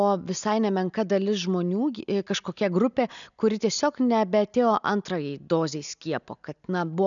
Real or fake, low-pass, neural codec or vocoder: real; 7.2 kHz; none